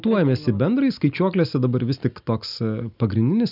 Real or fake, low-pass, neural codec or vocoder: real; 5.4 kHz; none